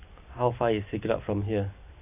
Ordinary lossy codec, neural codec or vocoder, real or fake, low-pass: none; none; real; 3.6 kHz